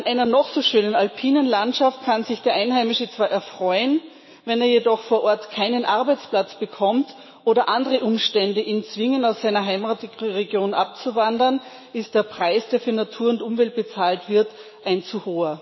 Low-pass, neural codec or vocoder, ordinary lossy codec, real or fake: 7.2 kHz; autoencoder, 48 kHz, 128 numbers a frame, DAC-VAE, trained on Japanese speech; MP3, 24 kbps; fake